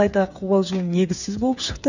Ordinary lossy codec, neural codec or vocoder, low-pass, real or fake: none; codec, 16 kHz, 8 kbps, FreqCodec, smaller model; 7.2 kHz; fake